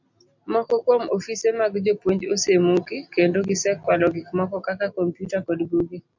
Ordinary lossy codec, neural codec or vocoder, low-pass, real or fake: MP3, 64 kbps; none; 7.2 kHz; real